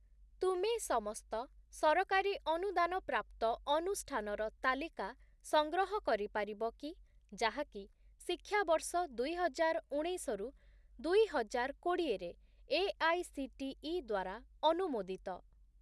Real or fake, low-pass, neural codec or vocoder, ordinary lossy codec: real; none; none; none